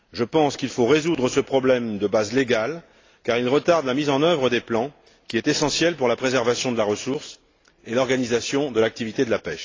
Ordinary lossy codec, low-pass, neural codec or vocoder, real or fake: AAC, 32 kbps; 7.2 kHz; none; real